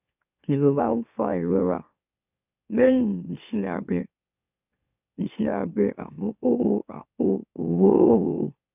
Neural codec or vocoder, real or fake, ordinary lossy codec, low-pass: autoencoder, 44.1 kHz, a latent of 192 numbers a frame, MeloTTS; fake; none; 3.6 kHz